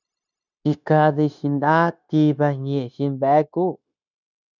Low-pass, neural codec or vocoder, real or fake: 7.2 kHz; codec, 16 kHz, 0.9 kbps, LongCat-Audio-Codec; fake